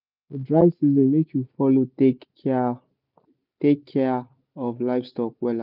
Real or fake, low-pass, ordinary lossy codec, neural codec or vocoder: real; 5.4 kHz; none; none